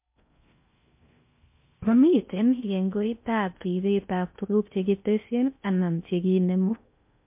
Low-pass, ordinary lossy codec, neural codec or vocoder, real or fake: 3.6 kHz; MP3, 32 kbps; codec, 16 kHz in and 24 kHz out, 0.6 kbps, FocalCodec, streaming, 4096 codes; fake